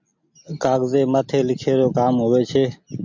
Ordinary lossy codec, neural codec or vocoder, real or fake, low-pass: MP3, 64 kbps; none; real; 7.2 kHz